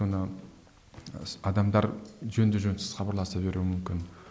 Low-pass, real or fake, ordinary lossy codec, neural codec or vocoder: none; real; none; none